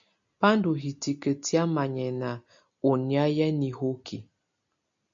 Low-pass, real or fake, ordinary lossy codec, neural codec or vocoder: 7.2 kHz; real; MP3, 64 kbps; none